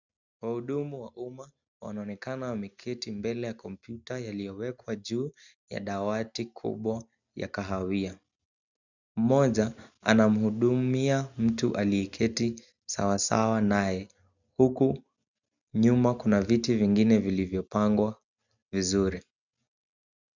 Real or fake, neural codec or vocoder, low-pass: real; none; 7.2 kHz